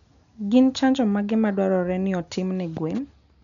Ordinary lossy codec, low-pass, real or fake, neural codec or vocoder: none; 7.2 kHz; real; none